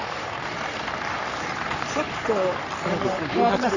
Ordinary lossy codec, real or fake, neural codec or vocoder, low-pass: none; fake; codec, 44.1 kHz, 3.4 kbps, Pupu-Codec; 7.2 kHz